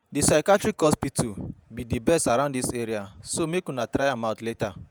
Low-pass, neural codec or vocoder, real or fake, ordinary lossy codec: none; vocoder, 48 kHz, 128 mel bands, Vocos; fake; none